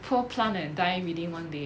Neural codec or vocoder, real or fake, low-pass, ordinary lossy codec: none; real; none; none